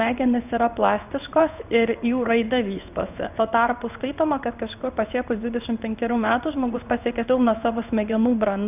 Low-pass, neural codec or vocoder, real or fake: 3.6 kHz; codec, 16 kHz in and 24 kHz out, 1 kbps, XY-Tokenizer; fake